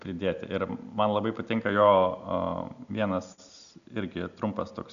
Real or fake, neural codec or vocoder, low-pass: real; none; 7.2 kHz